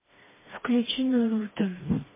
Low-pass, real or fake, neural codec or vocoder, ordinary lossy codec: 3.6 kHz; fake; codec, 16 kHz, 2 kbps, FreqCodec, smaller model; MP3, 16 kbps